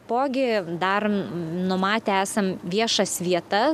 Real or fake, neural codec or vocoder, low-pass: real; none; 14.4 kHz